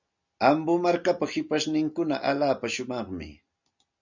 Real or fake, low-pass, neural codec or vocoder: real; 7.2 kHz; none